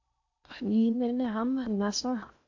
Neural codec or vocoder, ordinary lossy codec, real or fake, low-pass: codec, 16 kHz in and 24 kHz out, 0.8 kbps, FocalCodec, streaming, 65536 codes; AAC, 48 kbps; fake; 7.2 kHz